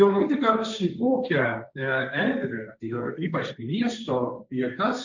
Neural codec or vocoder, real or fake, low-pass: codec, 16 kHz, 1.1 kbps, Voila-Tokenizer; fake; 7.2 kHz